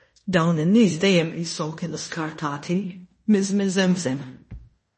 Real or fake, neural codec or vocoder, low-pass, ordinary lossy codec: fake; codec, 16 kHz in and 24 kHz out, 0.9 kbps, LongCat-Audio-Codec, fine tuned four codebook decoder; 10.8 kHz; MP3, 32 kbps